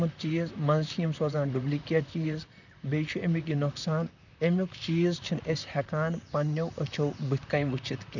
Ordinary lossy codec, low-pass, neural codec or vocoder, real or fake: none; 7.2 kHz; vocoder, 44.1 kHz, 128 mel bands, Pupu-Vocoder; fake